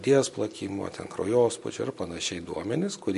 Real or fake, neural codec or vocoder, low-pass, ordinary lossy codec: real; none; 14.4 kHz; MP3, 48 kbps